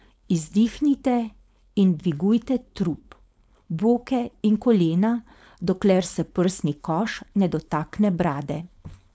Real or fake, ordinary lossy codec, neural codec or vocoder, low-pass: fake; none; codec, 16 kHz, 4.8 kbps, FACodec; none